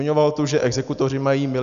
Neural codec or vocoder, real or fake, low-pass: none; real; 7.2 kHz